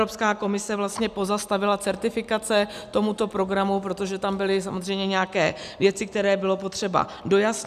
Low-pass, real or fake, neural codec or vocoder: 14.4 kHz; real; none